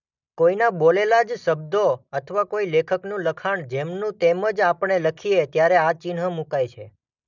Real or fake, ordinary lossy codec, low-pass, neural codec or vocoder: real; none; 7.2 kHz; none